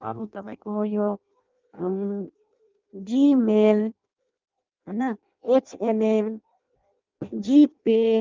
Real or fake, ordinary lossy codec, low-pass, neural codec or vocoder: fake; Opus, 32 kbps; 7.2 kHz; codec, 16 kHz in and 24 kHz out, 0.6 kbps, FireRedTTS-2 codec